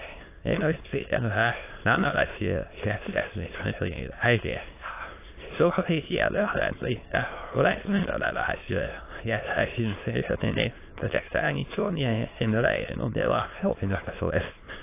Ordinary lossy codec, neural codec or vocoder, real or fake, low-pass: AAC, 24 kbps; autoencoder, 22.05 kHz, a latent of 192 numbers a frame, VITS, trained on many speakers; fake; 3.6 kHz